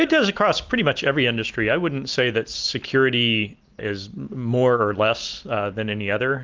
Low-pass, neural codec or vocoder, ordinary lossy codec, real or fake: 7.2 kHz; none; Opus, 32 kbps; real